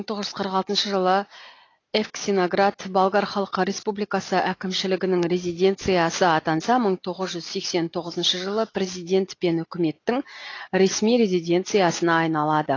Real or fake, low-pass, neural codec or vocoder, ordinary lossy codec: real; 7.2 kHz; none; AAC, 32 kbps